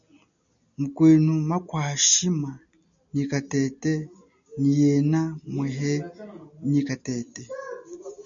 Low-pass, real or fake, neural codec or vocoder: 7.2 kHz; real; none